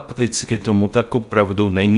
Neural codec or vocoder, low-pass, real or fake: codec, 16 kHz in and 24 kHz out, 0.6 kbps, FocalCodec, streaming, 4096 codes; 10.8 kHz; fake